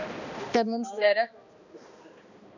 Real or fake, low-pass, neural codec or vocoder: fake; 7.2 kHz; codec, 16 kHz, 1 kbps, X-Codec, HuBERT features, trained on balanced general audio